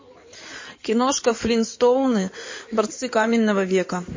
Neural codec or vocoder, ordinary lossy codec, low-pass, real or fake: codec, 16 kHz in and 24 kHz out, 2.2 kbps, FireRedTTS-2 codec; MP3, 32 kbps; 7.2 kHz; fake